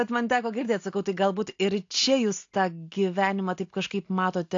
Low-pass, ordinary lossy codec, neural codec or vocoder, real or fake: 7.2 kHz; AAC, 48 kbps; none; real